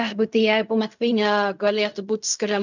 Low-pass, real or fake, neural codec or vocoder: 7.2 kHz; fake; codec, 16 kHz in and 24 kHz out, 0.4 kbps, LongCat-Audio-Codec, fine tuned four codebook decoder